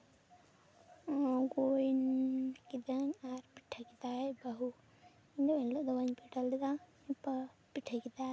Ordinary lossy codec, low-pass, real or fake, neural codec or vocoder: none; none; real; none